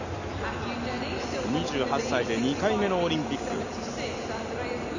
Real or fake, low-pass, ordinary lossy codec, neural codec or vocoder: real; 7.2 kHz; Opus, 64 kbps; none